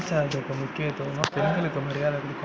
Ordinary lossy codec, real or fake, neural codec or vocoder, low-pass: none; real; none; none